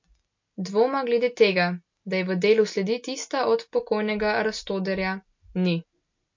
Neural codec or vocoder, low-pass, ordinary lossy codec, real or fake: none; 7.2 kHz; MP3, 48 kbps; real